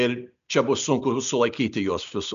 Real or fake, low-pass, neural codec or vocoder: real; 7.2 kHz; none